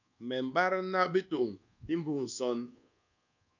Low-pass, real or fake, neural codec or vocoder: 7.2 kHz; fake; codec, 24 kHz, 1.2 kbps, DualCodec